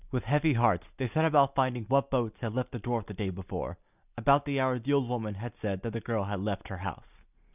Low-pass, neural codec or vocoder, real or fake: 3.6 kHz; none; real